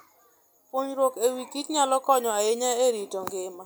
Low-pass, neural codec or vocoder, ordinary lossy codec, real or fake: none; none; none; real